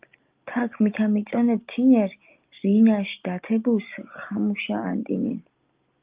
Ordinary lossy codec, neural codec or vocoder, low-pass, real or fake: Opus, 32 kbps; none; 3.6 kHz; real